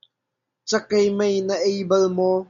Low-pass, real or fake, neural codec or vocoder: 7.2 kHz; real; none